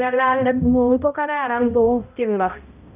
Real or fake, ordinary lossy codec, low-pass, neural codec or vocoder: fake; none; 3.6 kHz; codec, 16 kHz, 0.5 kbps, X-Codec, HuBERT features, trained on balanced general audio